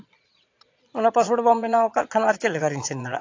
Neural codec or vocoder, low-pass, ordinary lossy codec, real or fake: vocoder, 22.05 kHz, 80 mel bands, Vocos; 7.2 kHz; AAC, 48 kbps; fake